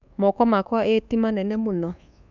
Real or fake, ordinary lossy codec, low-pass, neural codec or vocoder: fake; none; 7.2 kHz; codec, 24 kHz, 1.2 kbps, DualCodec